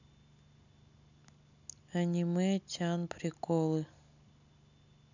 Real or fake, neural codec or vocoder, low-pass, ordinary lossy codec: real; none; 7.2 kHz; none